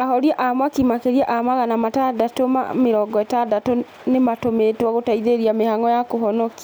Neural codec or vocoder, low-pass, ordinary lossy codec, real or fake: none; none; none; real